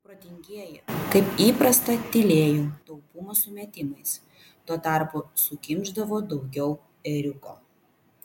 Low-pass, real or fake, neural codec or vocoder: 19.8 kHz; real; none